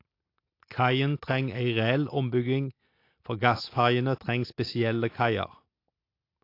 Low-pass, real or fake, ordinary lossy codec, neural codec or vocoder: 5.4 kHz; real; AAC, 32 kbps; none